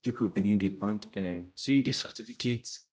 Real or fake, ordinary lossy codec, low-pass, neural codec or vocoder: fake; none; none; codec, 16 kHz, 0.5 kbps, X-Codec, HuBERT features, trained on general audio